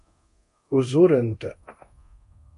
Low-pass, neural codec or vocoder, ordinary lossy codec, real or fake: 10.8 kHz; codec, 24 kHz, 0.9 kbps, DualCodec; MP3, 48 kbps; fake